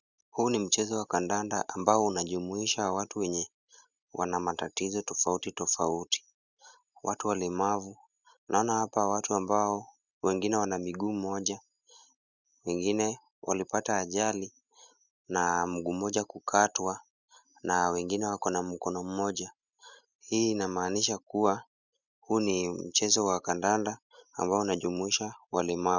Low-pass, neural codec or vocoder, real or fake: 7.2 kHz; none; real